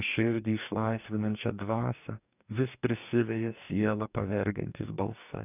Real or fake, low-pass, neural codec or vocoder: fake; 3.6 kHz; codec, 44.1 kHz, 2.6 kbps, DAC